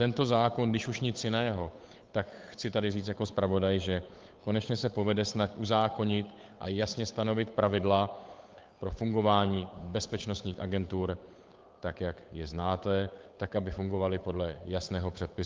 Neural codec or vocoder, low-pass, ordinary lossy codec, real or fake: codec, 16 kHz, 8 kbps, FunCodec, trained on Chinese and English, 25 frames a second; 7.2 kHz; Opus, 24 kbps; fake